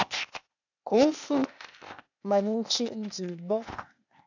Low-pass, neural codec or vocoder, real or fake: 7.2 kHz; codec, 16 kHz, 0.8 kbps, ZipCodec; fake